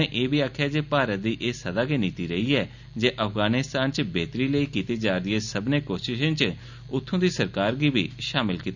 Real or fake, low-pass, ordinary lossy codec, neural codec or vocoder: real; 7.2 kHz; none; none